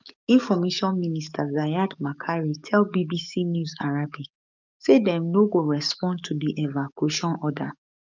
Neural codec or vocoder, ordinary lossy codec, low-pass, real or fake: codec, 44.1 kHz, 7.8 kbps, DAC; none; 7.2 kHz; fake